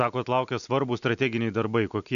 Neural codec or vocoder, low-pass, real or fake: none; 7.2 kHz; real